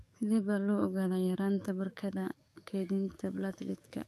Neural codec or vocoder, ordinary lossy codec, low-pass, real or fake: codec, 44.1 kHz, 7.8 kbps, DAC; none; 14.4 kHz; fake